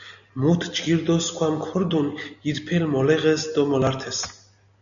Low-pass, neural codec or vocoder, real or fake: 7.2 kHz; none; real